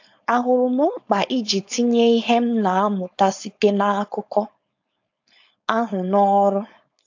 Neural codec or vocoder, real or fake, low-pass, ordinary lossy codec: codec, 16 kHz, 4.8 kbps, FACodec; fake; 7.2 kHz; AAC, 48 kbps